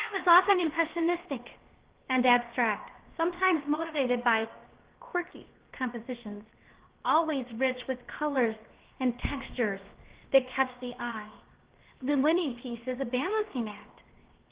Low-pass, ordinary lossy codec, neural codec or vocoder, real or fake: 3.6 kHz; Opus, 16 kbps; codec, 16 kHz, 0.7 kbps, FocalCodec; fake